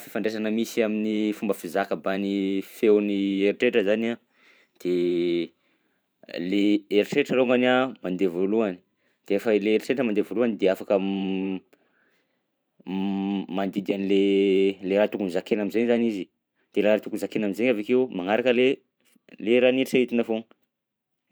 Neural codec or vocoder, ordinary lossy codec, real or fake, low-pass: vocoder, 44.1 kHz, 128 mel bands every 512 samples, BigVGAN v2; none; fake; none